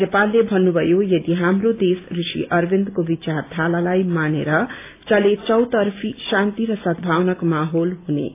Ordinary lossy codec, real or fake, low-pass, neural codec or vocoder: AAC, 24 kbps; real; 3.6 kHz; none